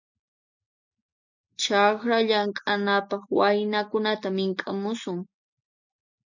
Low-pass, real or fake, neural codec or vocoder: 7.2 kHz; real; none